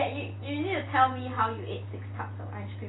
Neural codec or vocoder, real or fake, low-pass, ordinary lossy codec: none; real; 7.2 kHz; AAC, 16 kbps